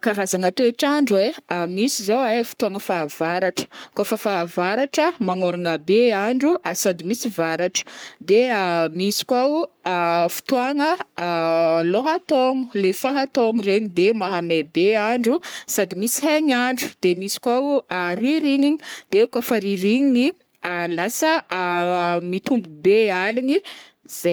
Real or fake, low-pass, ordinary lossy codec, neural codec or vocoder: fake; none; none; codec, 44.1 kHz, 3.4 kbps, Pupu-Codec